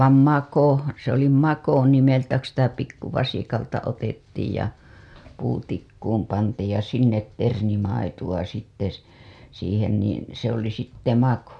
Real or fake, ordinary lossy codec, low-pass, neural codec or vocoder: real; none; none; none